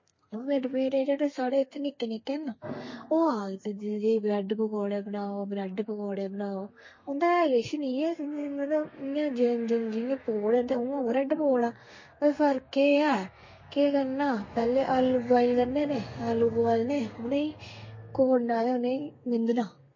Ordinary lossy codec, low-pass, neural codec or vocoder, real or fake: MP3, 32 kbps; 7.2 kHz; codec, 32 kHz, 1.9 kbps, SNAC; fake